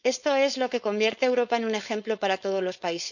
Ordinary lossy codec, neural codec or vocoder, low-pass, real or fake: none; codec, 16 kHz, 4.8 kbps, FACodec; 7.2 kHz; fake